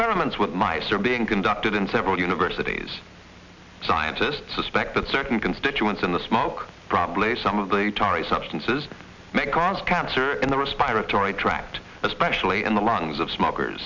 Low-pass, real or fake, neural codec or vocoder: 7.2 kHz; real; none